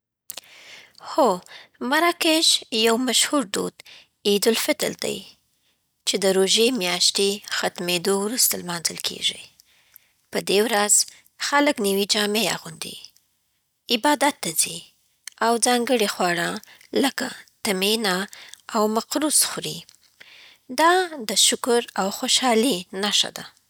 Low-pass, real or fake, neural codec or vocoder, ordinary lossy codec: none; real; none; none